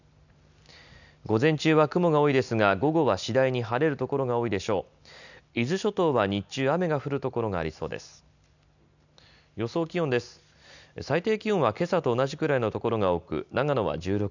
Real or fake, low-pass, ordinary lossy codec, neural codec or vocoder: real; 7.2 kHz; none; none